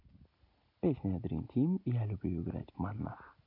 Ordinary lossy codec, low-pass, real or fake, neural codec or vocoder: none; 5.4 kHz; real; none